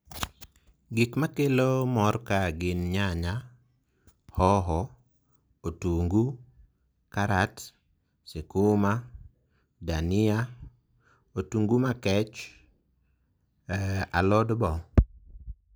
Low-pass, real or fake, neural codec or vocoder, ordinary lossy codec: none; real; none; none